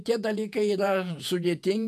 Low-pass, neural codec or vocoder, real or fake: 14.4 kHz; vocoder, 48 kHz, 128 mel bands, Vocos; fake